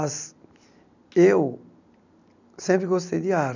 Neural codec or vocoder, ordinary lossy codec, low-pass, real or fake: none; none; 7.2 kHz; real